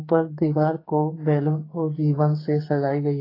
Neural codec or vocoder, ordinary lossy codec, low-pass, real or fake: codec, 44.1 kHz, 2.6 kbps, SNAC; AAC, 24 kbps; 5.4 kHz; fake